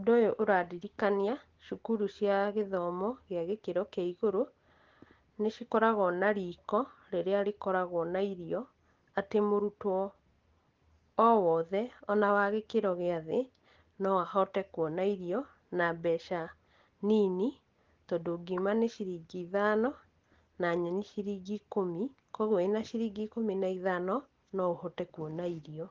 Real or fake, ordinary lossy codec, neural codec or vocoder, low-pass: real; Opus, 16 kbps; none; 7.2 kHz